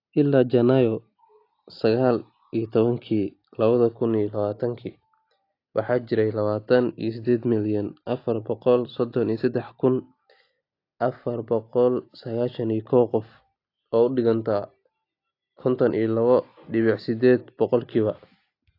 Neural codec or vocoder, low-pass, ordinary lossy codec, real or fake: none; 5.4 kHz; AAC, 32 kbps; real